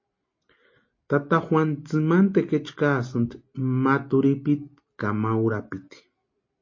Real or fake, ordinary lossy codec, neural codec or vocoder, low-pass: real; MP3, 32 kbps; none; 7.2 kHz